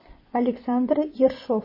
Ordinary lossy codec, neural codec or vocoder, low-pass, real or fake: MP3, 24 kbps; vocoder, 24 kHz, 100 mel bands, Vocos; 5.4 kHz; fake